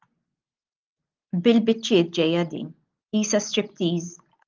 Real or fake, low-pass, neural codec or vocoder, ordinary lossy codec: real; 7.2 kHz; none; Opus, 32 kbps